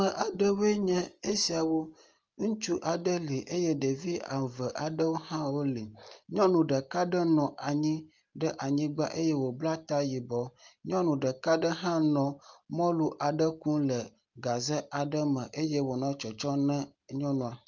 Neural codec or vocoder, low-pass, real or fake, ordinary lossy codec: none; 7.2 kHz; real; Opus, 24 kbps